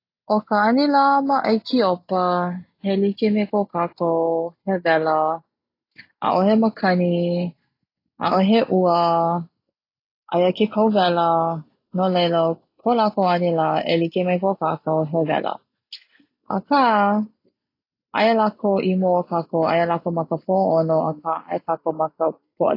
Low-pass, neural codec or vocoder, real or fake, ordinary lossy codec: 5.4 kHz; none; real; AAC, 32 kbps